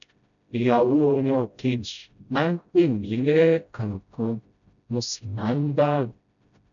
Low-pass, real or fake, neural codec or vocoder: 7.2 kHz; fake; codec, 16 kHz, 0.5 kbps, FreqCodec, smaller model